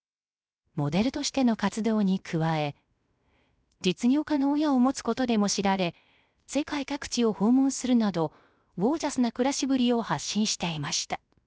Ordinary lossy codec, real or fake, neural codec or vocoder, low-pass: none; fake; codec, 16 kHz, 0.7 kbps, FocalCodec; none